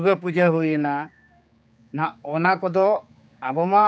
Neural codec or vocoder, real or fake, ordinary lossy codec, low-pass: codec, 16 kHz, 4 kbps, X-Codec, HuBERT features, trained on general audio; fake; none; none